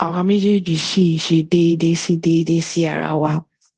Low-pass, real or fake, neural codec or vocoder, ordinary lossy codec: 10.8 kHz; fake; codec, 24 kHz, 0.5 kbps, DualCodec; Opus, 16 kbps